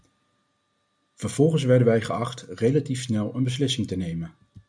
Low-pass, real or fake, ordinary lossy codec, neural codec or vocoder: 9.9 kHz; real; MP3, 96 kbps; none